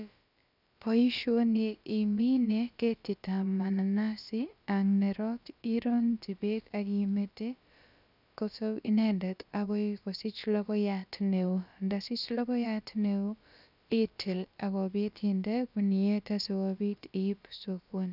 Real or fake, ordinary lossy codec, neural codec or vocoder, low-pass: fake; none; codec, 16 kHz, about 1 kbps, DyCAST, with the encoder's durations; 5.4 kHz